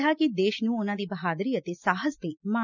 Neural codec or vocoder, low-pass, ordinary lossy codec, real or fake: none; 7.2 kHz; none; real